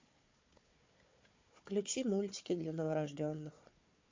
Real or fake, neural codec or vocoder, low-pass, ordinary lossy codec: fake; codec, 16 kHz, 4 kbps, FunCodec, trained on Chinese and English, 50 frames a second; 7.2 kHz; MP3, 48 kbps